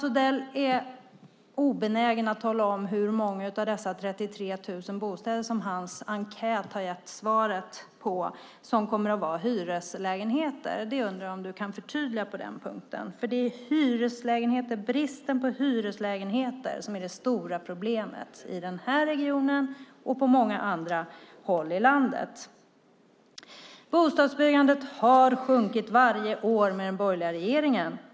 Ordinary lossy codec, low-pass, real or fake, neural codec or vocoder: none; none; real; none